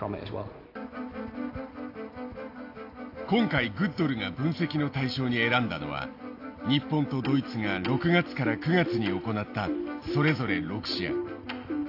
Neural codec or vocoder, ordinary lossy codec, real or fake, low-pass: none; AAC, 32 kbps; real; 5.4 kHz